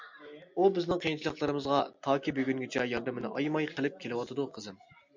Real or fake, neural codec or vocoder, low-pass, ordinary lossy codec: real; none; 7.2 kHz; MP3, 64 kbps